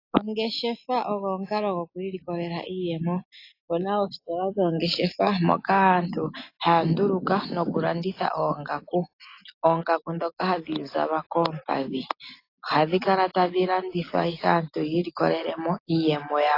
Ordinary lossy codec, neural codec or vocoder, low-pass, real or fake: AAC, 32 kbps; none; 5.4 kHz; real